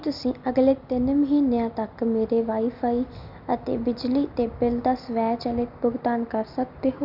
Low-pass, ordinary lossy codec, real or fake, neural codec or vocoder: 5.4 kHz; none; real; none